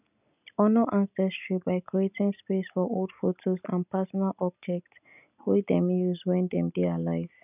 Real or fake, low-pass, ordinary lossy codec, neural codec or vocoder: real; 3.6 kHz; none; none